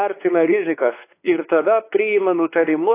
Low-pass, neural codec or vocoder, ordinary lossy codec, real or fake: 3.6 kHz; codec, 16 kHz, 4 kbps, X-Codec, WavLM features, trained on Multilingual LibriSpeech; AAC, 24 kbps; fake